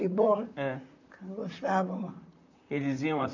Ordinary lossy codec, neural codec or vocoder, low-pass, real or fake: none; vocoder, 44.1 kHz, 128 mel bands, Pupu-Vocoder; 7.2 kHz; fake